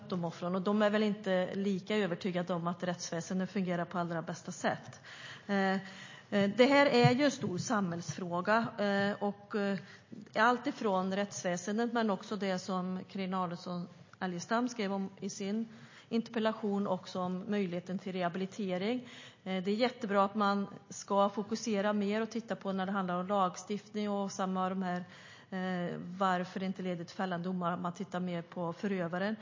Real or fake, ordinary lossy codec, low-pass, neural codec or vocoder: real; MP3, 32 kbps; 7.2 kHz; none